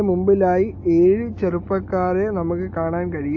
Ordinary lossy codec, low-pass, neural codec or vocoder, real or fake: AAC, 48 kbps; 7.2 kHz; none; real